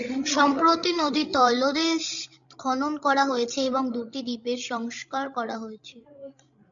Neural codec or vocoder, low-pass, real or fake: codec, 16 kHz, 16 kbps, FreqCodec, larger model; 7.2 kHz; fake